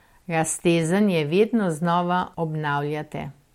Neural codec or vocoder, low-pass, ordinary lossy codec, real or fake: none; 19.8 kHz; MP3, 64 kbps; real